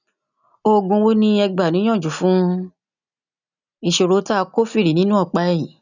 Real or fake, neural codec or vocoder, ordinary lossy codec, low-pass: real; none; none; 7.2 kHz